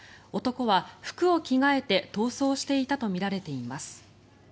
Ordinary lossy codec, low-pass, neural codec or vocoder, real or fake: none; none; none; real